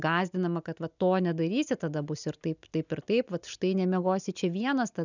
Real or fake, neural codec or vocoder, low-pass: real; none; 7.2 kHz